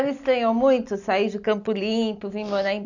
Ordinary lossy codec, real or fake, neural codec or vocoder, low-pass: none; real; none; 7.2 kHz